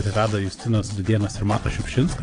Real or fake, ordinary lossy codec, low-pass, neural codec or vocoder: fake; AAC, 48 kbps; 9.9 kHz; vocoder, 22.05 kHz, 80 mel bands, WaveNeXt